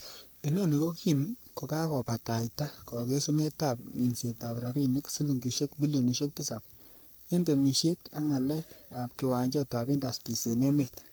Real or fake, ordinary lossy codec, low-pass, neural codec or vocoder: fake; none; none; codec, 44.1 kHz, 3.4 kbps, Pupu-Codec